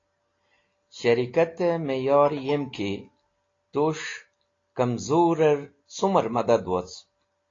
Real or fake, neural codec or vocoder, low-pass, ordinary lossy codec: real; none; 7.2 kHz; AAC, 32 kbps